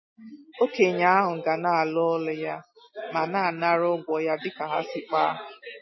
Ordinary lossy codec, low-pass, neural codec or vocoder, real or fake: MP3, 24 kbps; 7.2 kHz; none; real